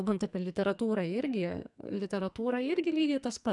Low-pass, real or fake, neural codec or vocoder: 10.8 kHz; fake; codec, 44.1 kHz, 2.6 kbps, SNAC